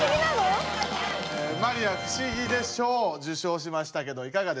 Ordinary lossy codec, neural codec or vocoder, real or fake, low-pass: none; none; real; none